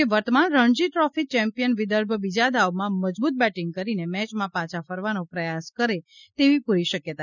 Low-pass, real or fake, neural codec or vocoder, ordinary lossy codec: 7.2 kHz; real; none; none